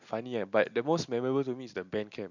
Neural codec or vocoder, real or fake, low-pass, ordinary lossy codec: none; real; 7.2 kHz; none